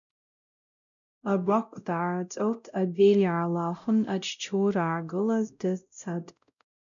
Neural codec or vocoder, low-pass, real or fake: codec, 16 kHz, 0.5 kbps, X-Codec, WavLM features, trained on Multilingual LibriSpeech; 7.2 kHz; fake